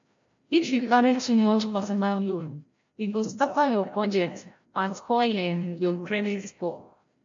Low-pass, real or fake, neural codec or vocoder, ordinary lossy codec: 7.2 kHz; fake; codec, 16 kHz, 0.5 kbps, FreqCodec, larger model; MP3, 48 kbps